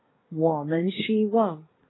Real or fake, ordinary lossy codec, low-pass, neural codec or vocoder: fake; AAC, 16 kbps; 7.2 kHz; codec, 24 kHz, 1 kbps, SNAC